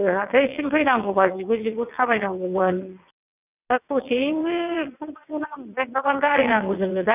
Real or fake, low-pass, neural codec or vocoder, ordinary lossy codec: fake; 3.6 kHz; vocoder, 22.05 kHz, 80 mel bands, WaveNeXt; none